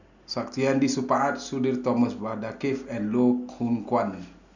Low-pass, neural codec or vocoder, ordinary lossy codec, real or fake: 7.2 kHz; none; none; real